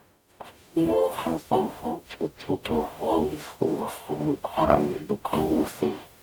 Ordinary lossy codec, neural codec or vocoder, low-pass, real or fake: none; codec, 44.1 kHz, 0.9 kbps, DAC; none; fake